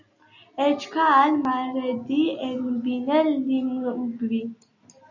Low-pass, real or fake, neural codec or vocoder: 7.2 kHz; real; none